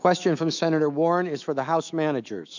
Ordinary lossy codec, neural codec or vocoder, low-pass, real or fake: MP3, 64 kbps; autoencoder, 48 kHz, 128 numbers a frame, DAC-VAE, trained on Japanese speech; 7.2 kHz; fake